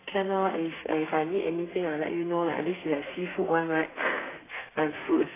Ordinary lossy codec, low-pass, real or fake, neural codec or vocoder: AAC, 16 kbps; 3.6 kHz; fake; codec, 32 kHz, 1.9 kbps, SNAC